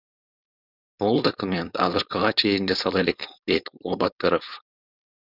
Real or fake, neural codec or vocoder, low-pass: fake; codec, 16 kHz, 4.8 kbps, FACodec; 5.4 kHz